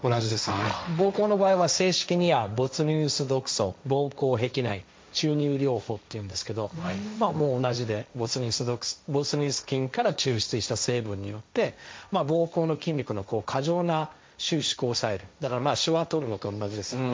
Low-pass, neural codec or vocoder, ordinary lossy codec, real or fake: none; codec, 16 kHz, 1.1 kbps, Voila-Tokenizer; none; fake